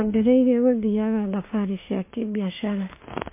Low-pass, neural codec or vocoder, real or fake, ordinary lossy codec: 3.6 kHz; autoencoder, 48 kHz, 32 numbers a frame, DAC-VAE, trained on Japanese speech; fake; MP3, 24 kbps